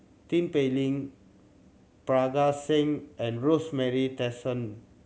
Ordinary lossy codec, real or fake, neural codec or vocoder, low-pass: none; real; none; none